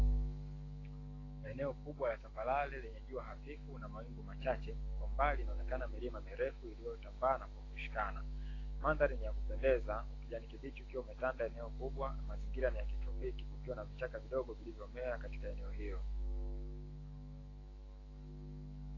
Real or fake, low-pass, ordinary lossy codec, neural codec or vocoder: fake; 7.2 kHz; AAC, 32 kbps; autoencoder, 48 kHz, 128 numbers a frame, DAC-VAE, trained on Japanese speech